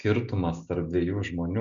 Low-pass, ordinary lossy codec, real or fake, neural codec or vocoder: 7.2 kHz; MP3, 64 kbps; real; none